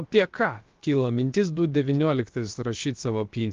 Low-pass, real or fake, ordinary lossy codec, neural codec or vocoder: 7.2 kHz; fake; Opus, 32 kbps; codec, 16 kHz, about 1 kbps, DyCAST, with the encoder's durations